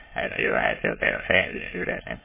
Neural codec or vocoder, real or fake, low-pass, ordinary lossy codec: autoencoder, 22.05 kHz, a latent of 192 numbers a frame, VITS, trained on many speakers; fake; 3.6 kHz; MP3, 16 kbps